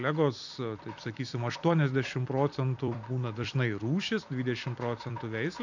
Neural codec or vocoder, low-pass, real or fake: vocoder, 44.1 kHz, 128 mel bands every 256 samples, BigVGAN v2; 7.2 kHz; fake